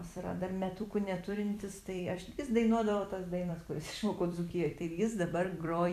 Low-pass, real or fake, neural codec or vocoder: 14.4 kHz; fake; vocoder, 44.1 kHz, 128 mel bands every 256 samples, BigVGAN v2